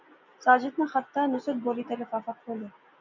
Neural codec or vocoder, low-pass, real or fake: none; 7.2 kHz; real